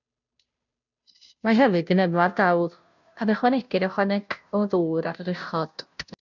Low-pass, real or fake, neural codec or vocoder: 7.2 kHz; fake; codec, 16 kHz, 0.5 kbps, FunCodec, trained on Chinese and English, 25 frames a second